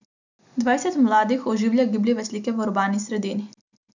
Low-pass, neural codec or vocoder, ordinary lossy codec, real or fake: 7.2 kHz; none; none; real